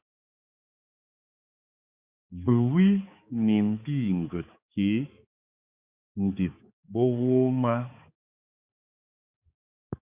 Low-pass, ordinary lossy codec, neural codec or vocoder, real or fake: 3.6 kHz; Opus, 64 kbps; codec, 16 kHz, 4 kbps, X-Codec, HuBERT features, trained on balanced general audio; fake